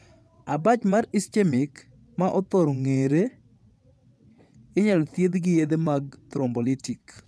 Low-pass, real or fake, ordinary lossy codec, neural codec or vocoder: none; fake; none; vocoder, 22.05 kHz, 80 mel bands, WaveNeXt